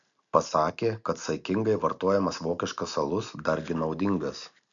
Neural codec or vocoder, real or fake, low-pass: none; real; 7.2 kHz